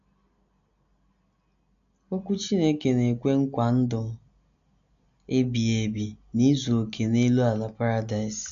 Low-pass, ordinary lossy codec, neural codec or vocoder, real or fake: 7.2 kHz; none; none; real